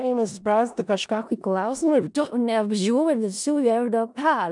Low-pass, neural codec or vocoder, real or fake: 10.8 kHz; codec, 16 kHz in and 24 kHz out, 0.4 kbps, LongCat-Audio-Codec, four codebook decoder; fake